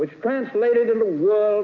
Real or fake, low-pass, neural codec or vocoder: real; 7.2 kHz; none